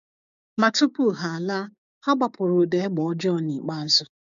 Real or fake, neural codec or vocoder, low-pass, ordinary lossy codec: fake; codec, 16 kHz, 6 kbps, DAC; 7.2 kHz; none